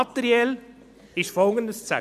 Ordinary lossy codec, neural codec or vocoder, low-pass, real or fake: none; none; 14.4 kHz; real